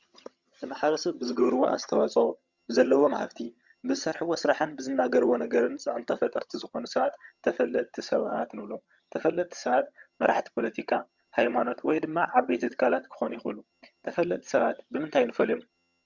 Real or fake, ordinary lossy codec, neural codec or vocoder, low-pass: fake; Opus, 64 kbps; vocoder, 22.05 kHz, 80 mel bands, HiFi-GAN; 7.2 kHz